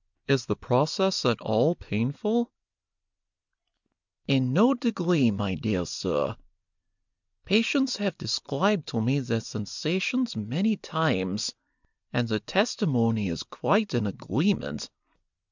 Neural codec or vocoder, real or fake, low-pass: none; real; 7.2 kHz